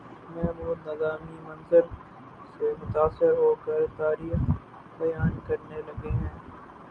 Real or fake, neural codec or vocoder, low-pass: real; none; 9.9 kHz